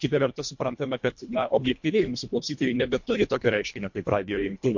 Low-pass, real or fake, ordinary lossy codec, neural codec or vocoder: 7.2 kHz; fake; MP3, 48 kbps; codec, 24 kHz, 1.5 kbps, HILCodec